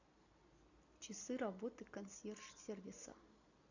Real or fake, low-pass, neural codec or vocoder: real; 7.2 kHz; none